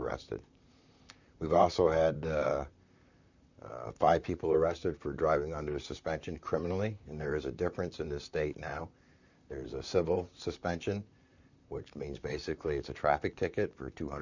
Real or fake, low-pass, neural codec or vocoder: fake; 7.2 kHz; vocoder, 44.1 kHz, 128 mel bands, Pupu-Vocoder